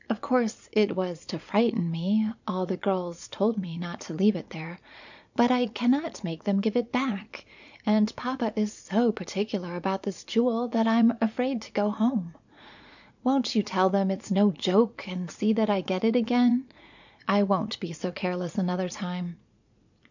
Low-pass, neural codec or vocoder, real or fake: 7.2 kHz; vocoder, 44.1 kHz, 80 mel bands, Vocos; fake